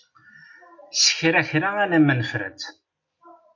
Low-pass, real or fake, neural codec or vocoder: 7.2 kHz; real; none